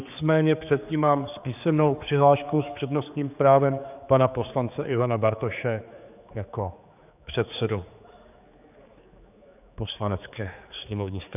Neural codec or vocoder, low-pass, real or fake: codec, 16 kHz, 4 kbps, X-Codec, HuBERT features, trained on general audio; 3.6 kHz; fake